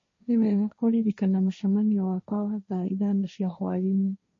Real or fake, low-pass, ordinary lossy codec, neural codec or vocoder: fake; 7.2 kHz; MP3, 32 kbps; codec, 16 kHz, 1.1 kbps, Voila-Tokenizer